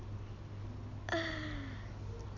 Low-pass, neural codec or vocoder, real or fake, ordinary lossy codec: 7.2 kHz; none; real; none